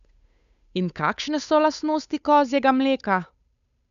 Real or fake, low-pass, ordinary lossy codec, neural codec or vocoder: fake; 7.2 kHz; none; codec, 16 kHz, 8 kbps, FunCodec, trained on Chinese and English, 25 frames a second